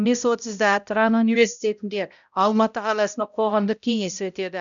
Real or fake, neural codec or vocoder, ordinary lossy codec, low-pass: fake; codec, 16 kHz, 0.5 kbps, X-Codec, HuBERT features, trained on balanced general audio; none; 7.2 kHz